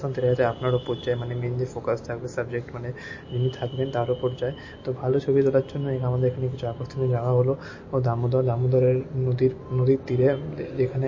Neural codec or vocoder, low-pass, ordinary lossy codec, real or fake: none; 7.2 kHz; MP3, 32 kbps; real